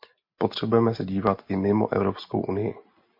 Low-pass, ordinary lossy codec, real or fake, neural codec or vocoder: 5.4 kHz; MP3, 32 kbps; real; none